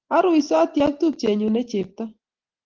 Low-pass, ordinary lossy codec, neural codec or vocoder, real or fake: 7.2 kHz; Opus, 16 kbps; none; real